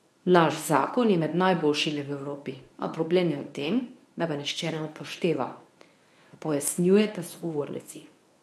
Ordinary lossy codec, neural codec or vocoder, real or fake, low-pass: none; codec, 24 kHz, 0.9 kbps, WavTokenizer, medium speech release version 1; fake; none